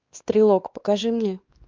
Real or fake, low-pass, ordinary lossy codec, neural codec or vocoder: fake; 7.2 kHz; Opus, 24 kbps; codec, 16 kHz, 2 kbps, X-Codec, WavLM features, trained on Multilingual LibriSpeech